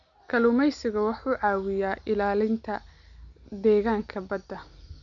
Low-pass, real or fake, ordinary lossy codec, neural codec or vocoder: 7.2 kHz; real; none; none